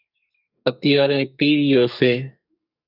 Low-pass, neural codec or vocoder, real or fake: 5.4 kHz; codec, 44.1 kHz, 2.6 kbps, SNAC; fake